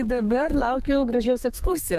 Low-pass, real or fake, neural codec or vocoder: 14.4 kHz; fake; codec, 32 kHz, 1.9 kbps, SNAC